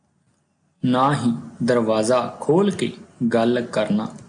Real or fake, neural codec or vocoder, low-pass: real; none; 9.9 kHz